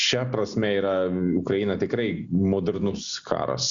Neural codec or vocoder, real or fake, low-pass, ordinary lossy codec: none; real; 7.2 kHz; Opus, 64 kbps